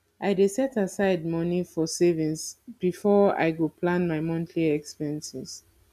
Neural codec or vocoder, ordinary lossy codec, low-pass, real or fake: none; none; 14.4 kHz; real